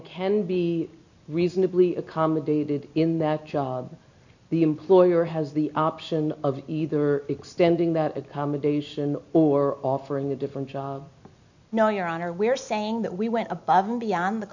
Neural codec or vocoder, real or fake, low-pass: none; real; 7.2 kHz